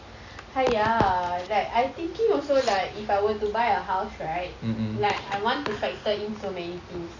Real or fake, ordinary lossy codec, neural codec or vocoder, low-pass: real; none; none; 7.2 kHz